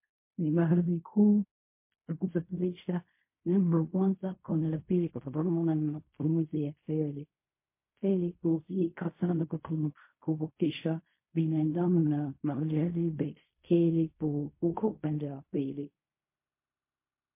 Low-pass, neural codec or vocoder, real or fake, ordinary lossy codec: 3.6 kHz; codec, 16 kHz in and 24 kHz out, 0.4 kbps, LongCat-Audio-Codec, fine tuned four codebook decoder; fake; MP3, 24 kbps